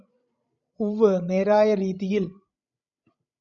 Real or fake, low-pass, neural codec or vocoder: fake; 7.2 kHz; codec, 16 kHz, 16 kbps, FreqCodec, larger model